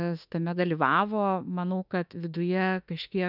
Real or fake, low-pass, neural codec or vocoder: fake; 5.4 kHz; autoencoder, 48 kHz, 32 numbers a frame, DAC-VAE, trained on Japanese speech